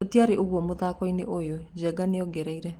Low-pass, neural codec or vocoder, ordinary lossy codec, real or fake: 19.8 kHz; none; Opus, 24 kbps; real